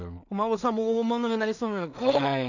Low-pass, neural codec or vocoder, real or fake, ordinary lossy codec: 7.2 kHz; codec, 16 kHz in and 24 kHz out, 0.4 kbps, LongCat-Audio-Codec, two codebook decoder; fake; none